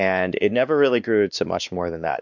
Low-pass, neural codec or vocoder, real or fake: 7.2 kHz; codec, 16 kHz, 2 kbps, X-Codec, WavLM features, trained on Multilingual LibriSpeech; fake